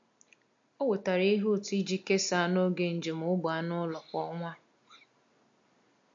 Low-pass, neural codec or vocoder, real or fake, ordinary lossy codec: 7.2 kHz; none; real; MP3, 48 kbps